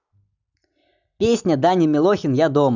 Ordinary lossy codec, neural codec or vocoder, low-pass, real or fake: none; none; 7.2 kHz; real